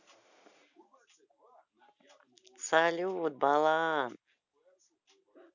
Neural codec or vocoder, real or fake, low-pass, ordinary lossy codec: none; real; 7.2 kHz; none